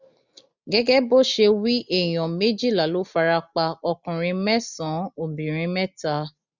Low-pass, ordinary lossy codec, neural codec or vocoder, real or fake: 7.2 kHz; none; none; real